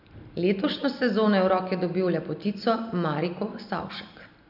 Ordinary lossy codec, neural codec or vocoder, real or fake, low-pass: none; none; real; 5.4 kHz